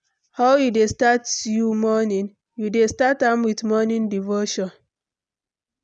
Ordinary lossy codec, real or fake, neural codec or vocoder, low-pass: none; real; none; 9.9 kHz